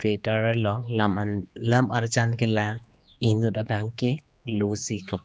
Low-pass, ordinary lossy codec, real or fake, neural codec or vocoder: none; none; fake; codec, 16 kHz, 2 kbps, X-Codec, HuBERT features, trained on general audio